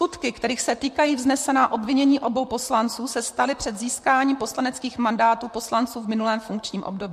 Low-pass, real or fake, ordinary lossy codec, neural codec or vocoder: 14.4 kHz; fake; MP3, 64 kbps; vocoder, 44.1 kHz, 128 mel bands, Pupu-Vocoder